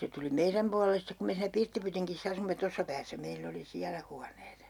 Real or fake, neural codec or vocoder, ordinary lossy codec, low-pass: real; none; none; 19.8 kHz